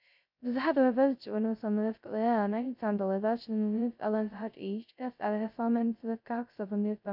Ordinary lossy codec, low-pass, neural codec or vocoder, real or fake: MP3, 48 kbps; 5.4 kHz; codec, 16 kHz, 0.2 kbps, FocalCodec; fake